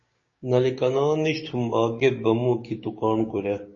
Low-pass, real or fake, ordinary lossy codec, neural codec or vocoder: 7.2 kHz; fake; MP3, 32 kbps; codec, 16 kHz, 6 kbps, DAC